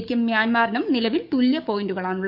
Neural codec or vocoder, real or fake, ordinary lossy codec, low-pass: codec, 44.1 kHz, 7.8 kbps, DAC; fake; none; 5.4 kHz